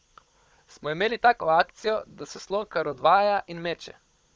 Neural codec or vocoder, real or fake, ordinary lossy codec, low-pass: codec, 16 kHz, 16 kbps, FunCodec, trained on Chinese and English, 50 frames a second; fake; none; none